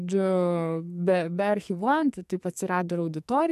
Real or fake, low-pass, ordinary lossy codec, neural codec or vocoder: fake; 14.4 kHz; AAC, 96 kbps; codec, 44.1 kHz, 2.6 kbps, SNAC